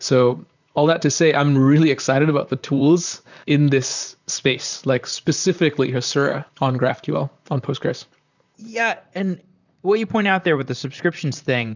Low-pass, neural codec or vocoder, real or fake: 7.2 kHz; vocoder, 44.1 kHz, 128 mel bands, Pupu-Vocoder; fake